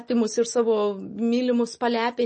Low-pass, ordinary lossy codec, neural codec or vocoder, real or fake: 9.9 kHz; MP3, 32 kbps; none; real